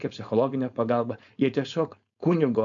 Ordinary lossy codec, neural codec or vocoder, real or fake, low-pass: MP3, 64 kbps; codec, 16 kHz, 4.8 kbps, FACodec; fake; 7.2 kHz